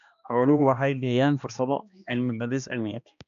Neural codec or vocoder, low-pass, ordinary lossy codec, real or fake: codec, 16 kHz, 1 kbps, X-Codec, HuBERT features, trained on balanced general audio; 7.2 kHz; AAC, 96 kbps; fake